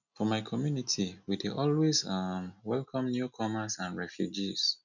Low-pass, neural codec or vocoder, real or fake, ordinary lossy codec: 7.2 kHz; none; real; none